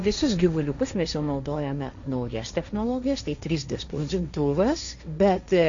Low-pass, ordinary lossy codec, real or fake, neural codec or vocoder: 7.2 kHz; MP3, 64 kbps; fake; codec, 16 kHz, 1.1 kbps, Voila-Tokenizer